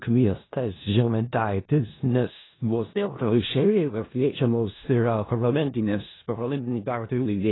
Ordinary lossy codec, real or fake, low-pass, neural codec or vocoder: AAC, 16 kbps; fake; 7.2 kHz; codec, 16 kHz in and 24 kHz out, 0.4 kbps, LongCat-Audio-Codec, four codebook decoder